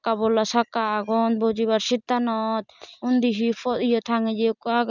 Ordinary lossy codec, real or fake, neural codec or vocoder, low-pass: none; fake; vocoder, 44.1 kHz, 128 mel bands every 256 samples, BigVGAN v2; 7.2 kHz